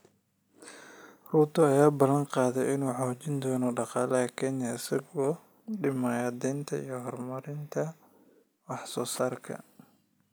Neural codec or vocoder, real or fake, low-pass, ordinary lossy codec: none; real; none; none